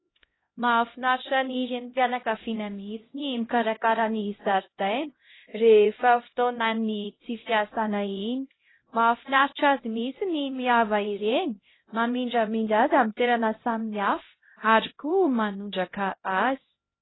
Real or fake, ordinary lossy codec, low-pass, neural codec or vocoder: fake; AAC, 16 kbps; 7.2 kHz; codec, 16 kHz, 0.5 kbps, X-Codec, HuBERT features, trained on LibriSpeech